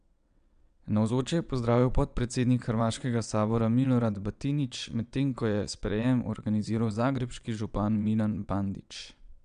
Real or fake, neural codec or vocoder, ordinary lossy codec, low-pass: fake; vocoder, 22.05 kHz, 80 mel bands, WaveNeXt; none; 9.9 kHz